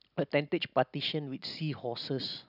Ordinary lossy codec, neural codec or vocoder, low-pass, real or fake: none; none; 5.4 kHz; real